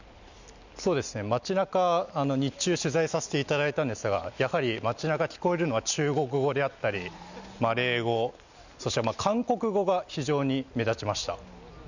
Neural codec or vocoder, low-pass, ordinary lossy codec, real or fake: none; 7.2 kHz; none; real